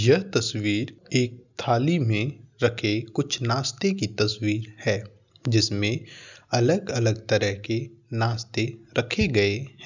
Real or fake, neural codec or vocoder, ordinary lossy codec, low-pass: real; none; none; 7.2 kHz